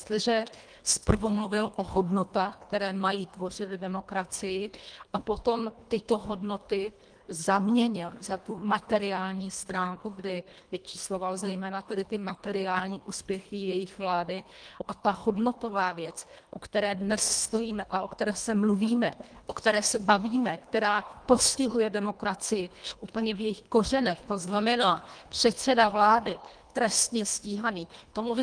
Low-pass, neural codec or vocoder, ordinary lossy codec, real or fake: 9.9 kHz; codec, 24 kHz, 1.5 kbps, HILCodec; Opus, 32 kbps; fake